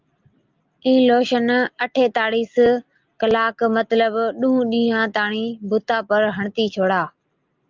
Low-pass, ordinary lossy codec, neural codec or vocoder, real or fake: 7.2 kHz; Opus, 24 kbps; none; real